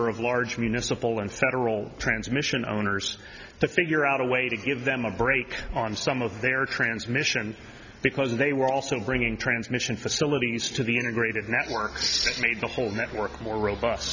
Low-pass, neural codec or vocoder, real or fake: 7.2 kHz; none; real